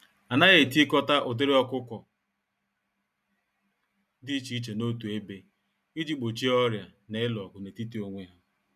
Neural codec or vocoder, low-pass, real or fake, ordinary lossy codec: none; 14.4 kHz; real; none